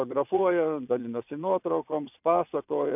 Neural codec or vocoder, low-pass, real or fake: vocoder, 22.05 kHz, 80 mel bands, Vocos; 3.6 kHz; fake